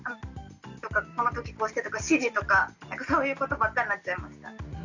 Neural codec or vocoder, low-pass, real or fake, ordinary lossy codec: none; 7.2 kHz; real; none